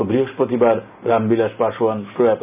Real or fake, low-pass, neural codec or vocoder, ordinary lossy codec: real; 3.6 kHz; none; none